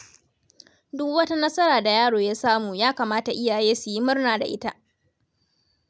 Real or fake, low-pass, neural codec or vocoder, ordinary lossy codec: real; none; none; none